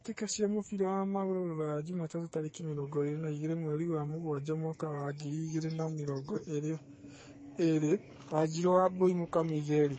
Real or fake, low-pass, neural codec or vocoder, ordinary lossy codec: fake; 9.9 kHz; codec, 44.1 kHz, 2.6 kbps, SNAC; MP3, 32 kbps